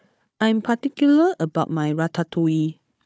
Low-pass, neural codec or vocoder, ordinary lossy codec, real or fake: none; codec, 16 kHz, 4 kbps, FunCodec, trained on Chinese and English, 50 frames a second; none; fake